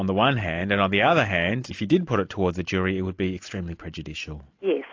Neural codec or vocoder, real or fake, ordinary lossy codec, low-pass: none; real; AAC, 48 kbps; 7.2 kHz